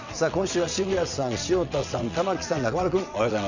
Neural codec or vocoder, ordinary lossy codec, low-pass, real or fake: vocoder, 22.05 kHz, 80 mel bands, WaveNeXt; none; 7.2 kHz; fake